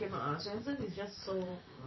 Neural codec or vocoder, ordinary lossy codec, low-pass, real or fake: vocoder, 44.1 kHz, 128 mel bands every 512 samples, BigVGAN v2; MP3, 24 kbps; 7.2 kHz; fake